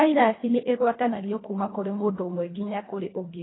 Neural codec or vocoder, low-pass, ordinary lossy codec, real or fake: codec, 24 kHz, 1.5 kbps, HILCodec; 7.2 kHz; AAC, 16 kbps; fake